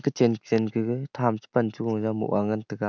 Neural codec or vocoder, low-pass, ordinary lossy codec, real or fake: none; 7.2 kHz; none; real